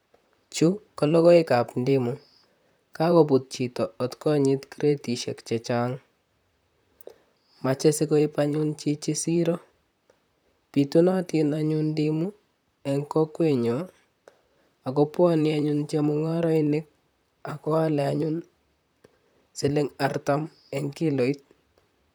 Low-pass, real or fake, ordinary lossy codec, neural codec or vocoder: none; fake; none; vocoder, 44.1 kHz, 128 mel bands, Pupu-Vocoder